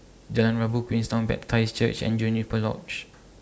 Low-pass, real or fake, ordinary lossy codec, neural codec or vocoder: none; real; none; none